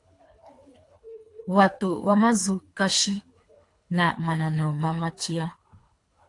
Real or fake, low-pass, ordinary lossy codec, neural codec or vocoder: fake; 10.8 kHz; AAC, 48 kbps; codec, 24 kHz, 3 kbps, HILCodec